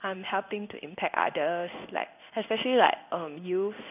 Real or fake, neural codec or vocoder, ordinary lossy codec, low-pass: fake; codec, 16 kHz in and 24 kHz out, 1 kbps, XY-Tokenizer; none; 3.6 kHz